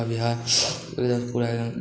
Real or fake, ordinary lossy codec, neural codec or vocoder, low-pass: real; none; none; none